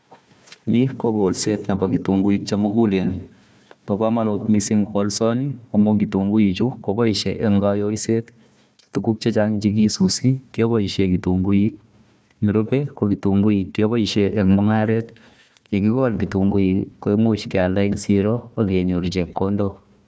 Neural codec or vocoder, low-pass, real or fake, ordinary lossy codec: codec, 16 kHz, 1 kbps, FunCodec, trained on Chinese and English, 50 frames a second; none; fake; none